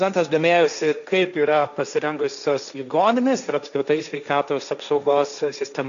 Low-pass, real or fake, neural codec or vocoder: 7.2 kHz; fake; codec, 16 kHz, 1.1 kbps, Voila-Tokenizer